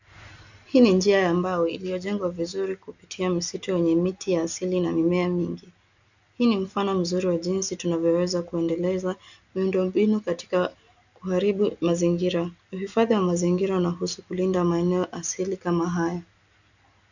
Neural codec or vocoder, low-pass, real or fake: none; 7.2 kHz; real